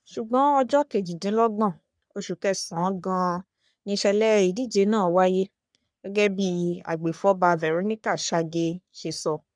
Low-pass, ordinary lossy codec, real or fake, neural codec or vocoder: 9.9 kHz; none; fake; codec, 44.1 kHz, 3.4 kbps, Pupu-Codec